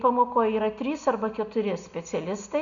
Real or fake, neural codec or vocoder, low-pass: real; none; 7.2 kHz